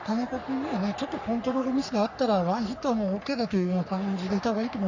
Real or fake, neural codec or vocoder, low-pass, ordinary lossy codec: fake; codec, 44.1 kHz, 3.4 kbps, Pupu-Codec; 7.2 kHz; none